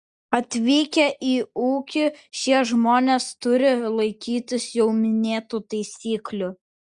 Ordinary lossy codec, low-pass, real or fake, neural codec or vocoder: Opus, 64 kbps; 9.9 kHz; real; none